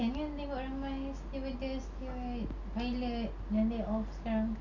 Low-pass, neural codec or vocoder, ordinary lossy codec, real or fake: 7.2 kHz; none; none; real